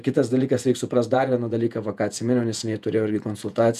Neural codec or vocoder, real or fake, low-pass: vocoder, 48 kHz, 128 mel bands, Vocos; fake; 14.4 kHz